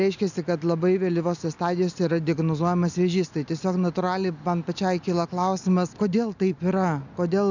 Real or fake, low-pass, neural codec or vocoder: real; 7.2 kHz; none